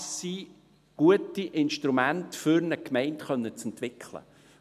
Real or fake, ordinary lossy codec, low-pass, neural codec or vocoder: real; none; 14.4 kHz; none